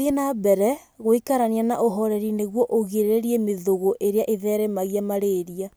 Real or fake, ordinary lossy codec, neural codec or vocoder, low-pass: real; none; none; none